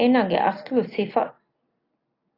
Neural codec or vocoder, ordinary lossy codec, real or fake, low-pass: none; AAC, 24 kbps; real; 5.4 kHz